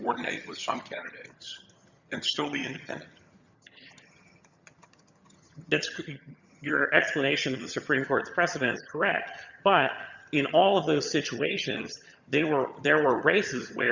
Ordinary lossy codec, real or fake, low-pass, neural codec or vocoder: Opus, 64 kbps; fake; 7.2 kHz; vocoder, 22.05 kHz, 80 mel bands, HiFi-GAN